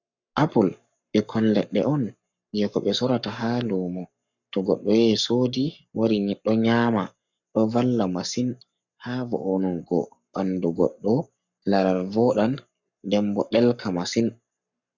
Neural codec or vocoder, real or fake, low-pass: codec, 44.1 kHz, 7.8 kbps, Pupu-Codec; fake; 7.2 kHz